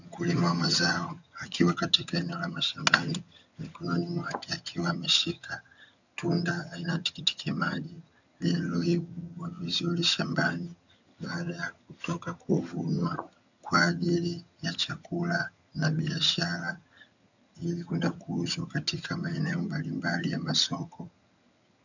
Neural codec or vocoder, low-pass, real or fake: vocoder, 22.05 kHz, 80 mel bands, HiFi-GAN; 7.2 kHz; fake